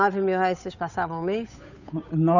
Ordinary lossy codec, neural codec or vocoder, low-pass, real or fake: none; codec, 16 kHz, 8 kbps, FunCodec, trained on Chinese and English, 25 frames a second; 7.2 kHz; fake